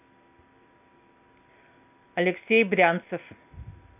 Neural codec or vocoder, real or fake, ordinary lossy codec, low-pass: none; real; none; 3.6 kHz